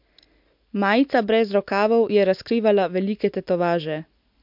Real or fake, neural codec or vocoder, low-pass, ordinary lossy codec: real; none; 5.4 kHz; MP3, 48 kbps